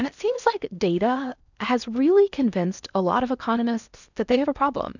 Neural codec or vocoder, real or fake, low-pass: codec, 16 kHz in and 24 kHz out, 0.8 kbps, FocalCodec, streaming, 65536 codes; fake; 7.2 kHz